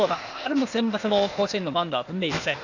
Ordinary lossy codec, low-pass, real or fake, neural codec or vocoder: none; 7.2 kHz; fake; codec, 16 kHz, 0.8 kbps, ZipCodec